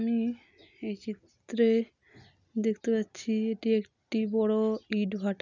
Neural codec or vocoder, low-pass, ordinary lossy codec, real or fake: none; 7.2 kHz; none; real